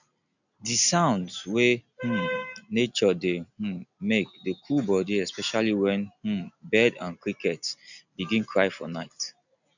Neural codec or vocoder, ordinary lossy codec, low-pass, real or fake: none; none; 7.2 kHz; real